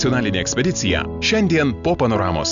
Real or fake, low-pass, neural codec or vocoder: real; 7.2 kHz; none